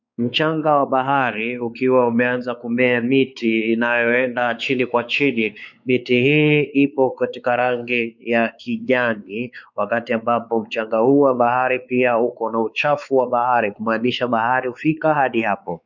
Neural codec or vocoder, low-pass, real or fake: codec, 16 kHz, 4 kbps, X-Codec, WavLM features, trained on Multilingual LibriSpeech; 7.2 kHz; fake